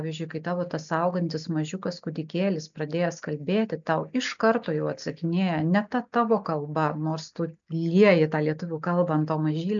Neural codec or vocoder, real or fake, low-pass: none; real; 7.2 kHz